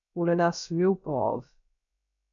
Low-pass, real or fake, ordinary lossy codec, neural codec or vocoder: 7.2 kHz; fake; MP3, 96 kbps; codec, 16 kHz, about 1 kbps, DyCAST, with the encoder's durations